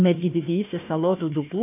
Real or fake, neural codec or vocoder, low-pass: fake; codec, 16 kHz, 1 kbps, FunCodec, trained on Chinese and English, 50 frames a second; 3.6 kHz